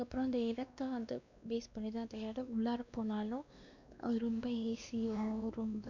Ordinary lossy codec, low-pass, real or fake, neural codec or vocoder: none; 7.2 kHz; fake; codec, 16 kHz, 2 kbps, X-Codec, WavLM features, trained on Multilingual LibriSpeech